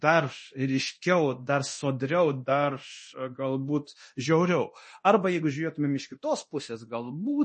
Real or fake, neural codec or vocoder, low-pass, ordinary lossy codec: fake; codec, 24 kHz, 0.9 kbps, DualCodec; 10.8 kHz; MP3, 32 kbps